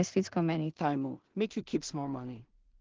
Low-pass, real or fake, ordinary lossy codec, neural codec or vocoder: 7.2 kHz; fake; Opus, 16 kbps; codec, 16 kHz in and 24 kHz out, 0.4 kbps, LongCat-Audio-Codec, two codebook decoder